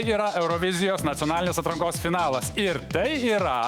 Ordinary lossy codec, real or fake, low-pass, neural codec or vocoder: Opus, 64 kbps; fake; 19.8 kHz; autoencoder, 48 kHz, 128 numbers a frame, DAC-VAE, trained on Japanese speech